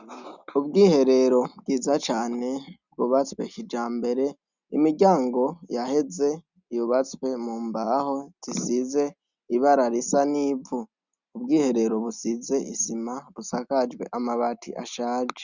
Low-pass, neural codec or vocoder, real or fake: 7.2 kHz; none; real